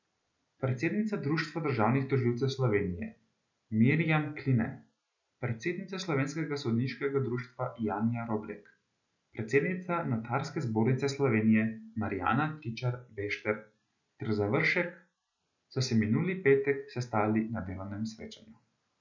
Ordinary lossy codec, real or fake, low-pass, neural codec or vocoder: none; real; 7.2 kHz; none